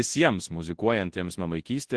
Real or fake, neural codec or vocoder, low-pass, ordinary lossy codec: fake; codec, 24 kHz, 0.9 kbps, WavTokenizer, large speech release; 10.8 kHz; Opus, 16 kbps